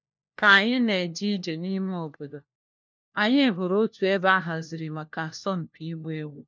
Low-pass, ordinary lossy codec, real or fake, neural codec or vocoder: none; none; fake; codec, 16 kHz, 1 kbps, FunCodec, trained on LibriTTS, 50 frames a second